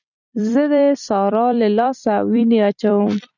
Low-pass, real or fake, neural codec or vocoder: 7.2 kHz; fake; vocoder, 44.1 kHz, 128 mel bands every 256 samples, BigVGAN v2